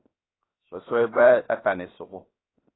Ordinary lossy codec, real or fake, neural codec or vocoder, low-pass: AAC, 16 kbps; fake; codec, 16 kHz, 0.7 kbps, FocalCodec; 7.2 kHz